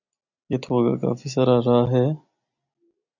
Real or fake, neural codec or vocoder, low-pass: real; none; 7.2 kHz